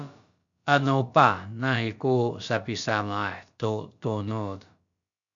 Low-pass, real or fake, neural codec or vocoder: 7.2 kHz; fake; codec, 16 kHz, about 1 kbps, DyCAST, with the encoder's durations